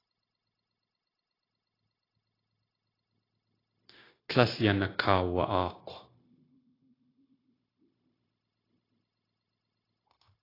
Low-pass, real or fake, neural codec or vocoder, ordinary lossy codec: 5.4 kHz; fake; codec, 16 kHz, 0.9 kbps, LongCat-Audio-Codec; AAC, 24 kbps